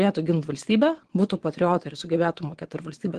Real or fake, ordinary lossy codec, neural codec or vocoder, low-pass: fake; Opus, 16 kbps; vocoder, 22.05 kHz, 80 mel bands, WaveNeXt; 9.9 kHz